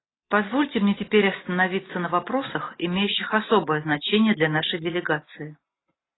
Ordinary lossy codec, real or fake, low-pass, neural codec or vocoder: AAC, 16 kbps; real; 7.2 kHz; none